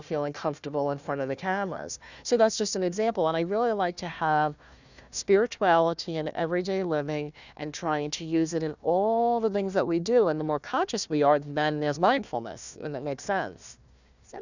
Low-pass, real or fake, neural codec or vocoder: 7.2 kHz; fake; codec, 16 kHz, 1 kbps, FunCodec, trained on Chinese and English, 50 frames a second